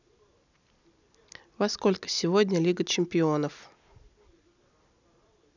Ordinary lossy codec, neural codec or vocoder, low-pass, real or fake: none; none; 7.2 kHz; real